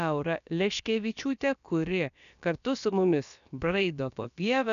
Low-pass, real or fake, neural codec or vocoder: 7.2 kHz; fake; codec, 16 kHz, about 1 kbps, DyCAST, with the encoder's durations